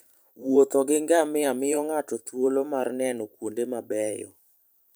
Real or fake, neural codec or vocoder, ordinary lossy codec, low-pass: fake; vocoder, 44.1 kHz, 128 mel bands every 512 samples, BigVGAN v2; none; none